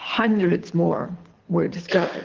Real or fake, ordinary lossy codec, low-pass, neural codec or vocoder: fake; Opus, 16 kbps; 7.2 kHz; codec, 24 kHz, 6 kbps, HILCodec